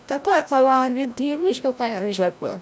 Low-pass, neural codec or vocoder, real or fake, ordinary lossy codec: none; codec, 16 kHz, 0.5 kbps, FreqCodec, larger model; fake; none